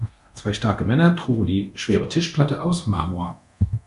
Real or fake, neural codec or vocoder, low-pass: fake; codec, 24 kHz, 0.9 kbps, DualCodec; 10.8 kHz